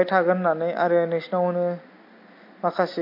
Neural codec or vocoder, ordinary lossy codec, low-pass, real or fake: none; MP3, 32 kbps; 5.4 kHz; real